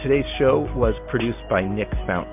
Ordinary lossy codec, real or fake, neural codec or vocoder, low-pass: MP3, 32 kbps; real; none; 3.6 kHz